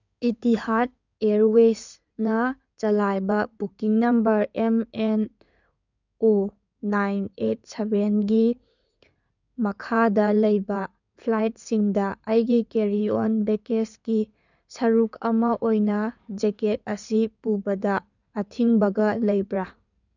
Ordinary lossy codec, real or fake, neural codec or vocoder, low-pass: none; fake; codec, 16 kHz in and 24 kHz out, 2.2 kbps, FireRedTTS-2 codec; 7.2 kHz